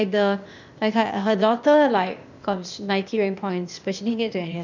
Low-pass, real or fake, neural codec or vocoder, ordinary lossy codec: 7.2 kHz; fake; codec, 16 kHz, 0.8 kbps, ZipCodec; none